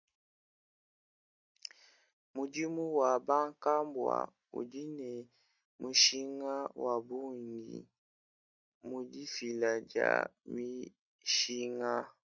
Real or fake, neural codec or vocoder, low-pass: real; none; 7.2 kHz